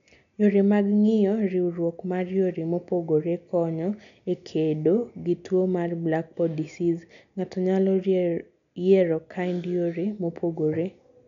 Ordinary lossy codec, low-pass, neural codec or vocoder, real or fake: none; 7.2 kHz; none; real